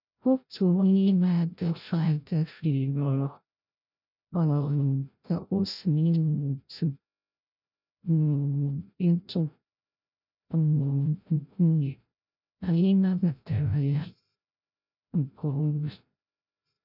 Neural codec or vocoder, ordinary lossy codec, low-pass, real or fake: codec, 16 kHz, 0.5 kbps, FreqCodec, larger model; none; 5.4 kHz; fake